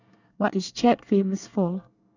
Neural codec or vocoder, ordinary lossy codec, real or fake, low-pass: codec, 24 kHz, 1 kbps, SNAC; none; fake; 7.2 kHz